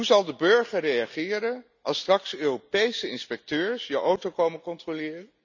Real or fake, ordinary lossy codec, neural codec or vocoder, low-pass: real; none; none; 7.2 kHz